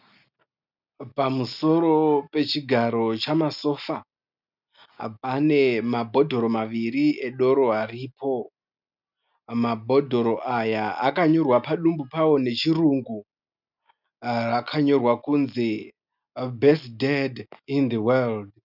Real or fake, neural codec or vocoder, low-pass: real; none; 5.4 kHz